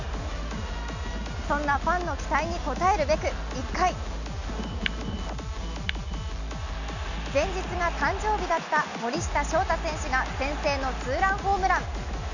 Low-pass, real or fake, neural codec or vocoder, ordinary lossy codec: 7.2 kHz; real; none; none